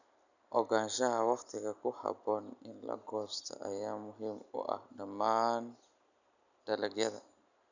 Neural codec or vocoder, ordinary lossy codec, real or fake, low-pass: none; none; real; 7.2 kHz